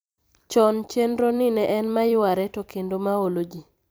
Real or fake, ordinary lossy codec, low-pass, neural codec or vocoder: fake; none; none; vocoder, 44.1 kHz, 128 mel bands every 256 samples, BigVGAN v2